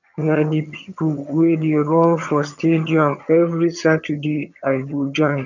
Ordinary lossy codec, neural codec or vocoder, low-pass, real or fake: none; vocoder, 22.05 kHz, 80 mel bands, HiFi-GAN; 7.2 kHz; fake